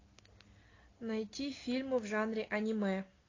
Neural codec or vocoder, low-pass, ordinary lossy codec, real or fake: none; 7.2 kHz; AAC, 32 kbps; real